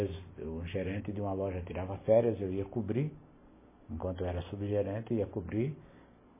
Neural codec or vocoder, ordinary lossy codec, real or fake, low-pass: none; MP3, 16 kbps; real; 3.6 kHz